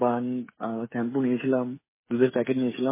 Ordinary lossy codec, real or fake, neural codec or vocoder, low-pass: MP3, 16 kbps; fake; codec, 16 kHz, 8 kbps, FreqCodec, smaller model; 3.6 kHz